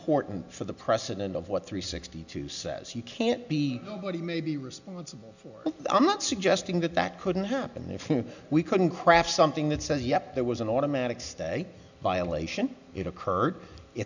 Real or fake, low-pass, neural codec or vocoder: real; 7.2 kHz; none